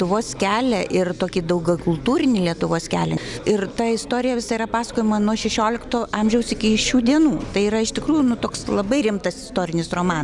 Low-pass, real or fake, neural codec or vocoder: 10.8 kHz; real; none